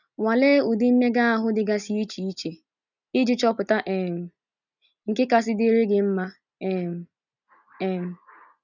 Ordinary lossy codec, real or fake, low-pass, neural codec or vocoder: none; real; 7.2 kHz; none